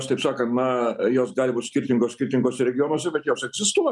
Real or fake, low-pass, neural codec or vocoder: real; 10.8 kHz; none